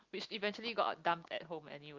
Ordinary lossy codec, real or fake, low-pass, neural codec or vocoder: Opus, 24 kbps; real; 7.2 kHz; none